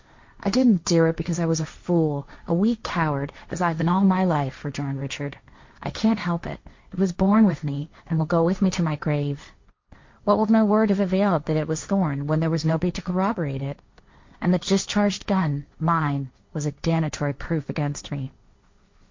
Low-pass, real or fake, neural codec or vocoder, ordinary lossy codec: 7.2 kHz; fake; codec, 16 kHz, 1.1 kbps, Voila-Tokenizer; MP3, 48 kbps